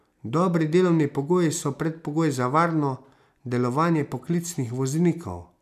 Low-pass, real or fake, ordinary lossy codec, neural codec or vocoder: 14.4 kHz; real; none; none